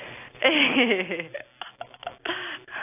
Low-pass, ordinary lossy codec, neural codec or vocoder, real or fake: 3.6 kHz; AAC, 24 kbps; none; real